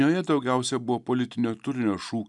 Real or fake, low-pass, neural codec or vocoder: real; 10.8 kHz; none